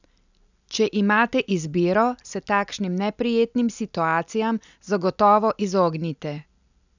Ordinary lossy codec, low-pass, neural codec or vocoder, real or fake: none; 7.2 kHz; none; real